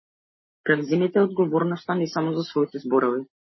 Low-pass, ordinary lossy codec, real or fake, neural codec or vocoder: 7.2 kHz; MP3, 24 kbps; real; none